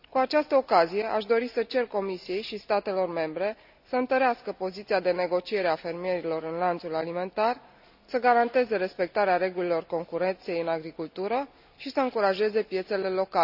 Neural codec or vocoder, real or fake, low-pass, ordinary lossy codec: none; real; 5.4 kHz; none